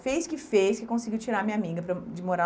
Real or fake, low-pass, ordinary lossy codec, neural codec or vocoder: real; none; none; none